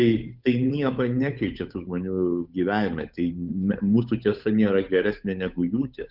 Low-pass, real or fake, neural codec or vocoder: 5.4 kHz; fake; codec, 16 kHz, 8 kbps, FunCodec, trained on Chinese and English, 25 frames a second